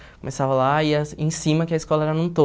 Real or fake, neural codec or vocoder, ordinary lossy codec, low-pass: real; none; none; none